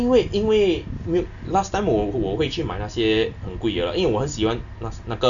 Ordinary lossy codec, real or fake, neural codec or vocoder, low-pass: none; real; none; 7.2 kHz